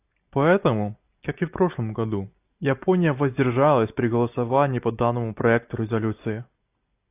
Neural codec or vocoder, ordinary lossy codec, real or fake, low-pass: none; AAC, 32 kbps; real; 3.6 kHz